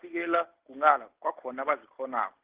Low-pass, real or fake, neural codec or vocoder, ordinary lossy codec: 3.6 kHz; real; none; Opus, 16 kbps